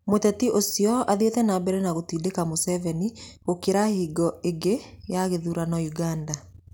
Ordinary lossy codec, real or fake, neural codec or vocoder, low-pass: none; real; none; 19.8 kHz